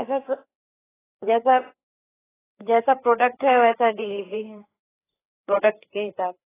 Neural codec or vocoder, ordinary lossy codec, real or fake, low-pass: codec, 16 kHz, 4 kbps, FreqCodec, larger model; AAC, 16 kbps; fake; 3.6 kHz